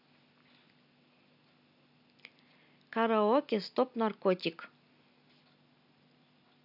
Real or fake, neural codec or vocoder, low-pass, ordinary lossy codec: real; none; 5.4 kHz; none